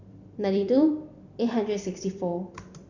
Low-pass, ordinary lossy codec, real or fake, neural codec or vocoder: 7.2 kHz; none; fake; vocoder, 44.1 kHz, 128 mel bands every 256 samples, BigVGAN v2